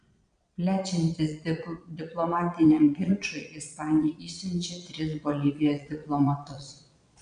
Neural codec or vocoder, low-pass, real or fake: vocoder, 22.05 kHz, 80 mel bands, Vocos; 9.9 kHz; fake